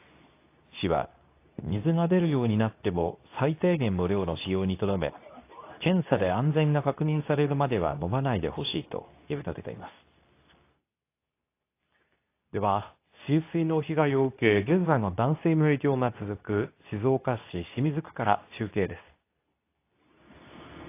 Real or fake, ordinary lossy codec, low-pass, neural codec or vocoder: fake; AAC, 24 kbps; 3.6 kHz; codec, 24 kHz, 0.9 kbps, WavTokenizer, medium speech release version 2